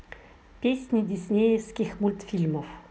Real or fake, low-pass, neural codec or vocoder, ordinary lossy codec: real; none; none; none